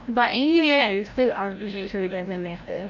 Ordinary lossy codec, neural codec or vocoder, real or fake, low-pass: none; codec, 16 kHz, 0.5 kbps, FreqCodec, larger model; fake; 7.2 kHz